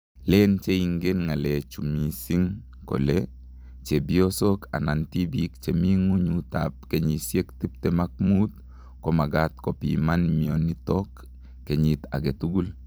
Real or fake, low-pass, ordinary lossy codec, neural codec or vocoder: fake; none; none; vocoder, 44.1 kHz, 128 mel bands every 256 samples, BigVGAN v2